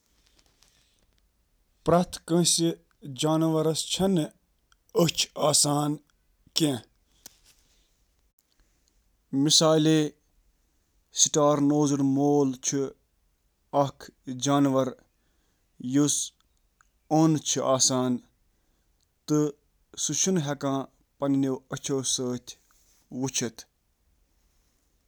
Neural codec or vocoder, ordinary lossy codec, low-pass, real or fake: none; none; none; real